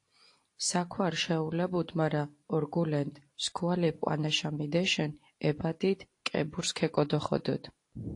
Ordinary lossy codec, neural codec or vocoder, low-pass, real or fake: AAC, 48 kbps; none; 10.8 kHz; real